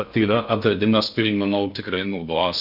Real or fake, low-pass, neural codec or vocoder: fake; 5.4 kHz; codec, 16 kHz in and 24 kHz out, 0.6 kbps, FocalCodec, streaming, 2048 codes